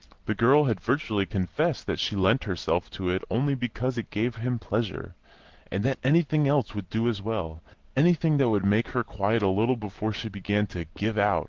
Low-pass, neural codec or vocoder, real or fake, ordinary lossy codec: 7.2 kHz; none; real; Opus, 16 kbps